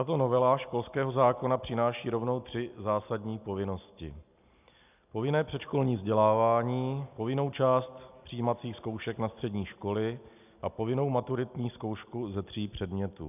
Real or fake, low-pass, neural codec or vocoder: real; 3.6 kHz; none